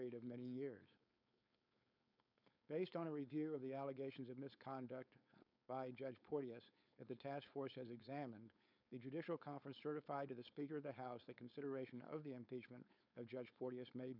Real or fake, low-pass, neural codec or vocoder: fake; 5.4 kHz; codec, 16 kHz, 4.8 kbps, FACodec